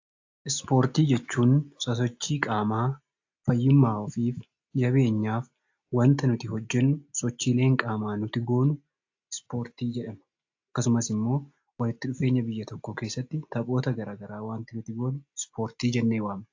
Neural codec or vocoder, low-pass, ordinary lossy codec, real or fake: none; 7.2 kHz; AAC, 48 kbps; real